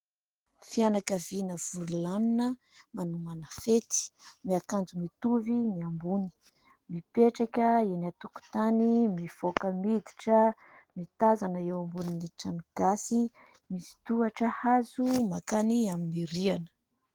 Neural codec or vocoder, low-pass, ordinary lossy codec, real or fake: autoencoder, 48 kHz, 128 numbers a frame, DAC-VAE, trained on Japanese speech; 19.8 kHz; Opus, 16 kbps; fake